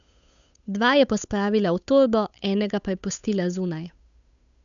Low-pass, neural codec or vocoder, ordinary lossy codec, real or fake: 7.2 kHz; codec, 16 kHz, 8 kbps, FunCodec, trained on Chinese and English, 25 frames a second; MP3, 96 kbps; fake